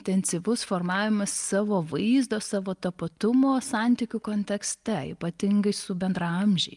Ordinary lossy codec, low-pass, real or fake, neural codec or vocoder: Opus, 32 kbps; 10.8 kHz; real; none